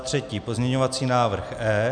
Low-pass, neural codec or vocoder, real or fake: 9.9 kHz; none; real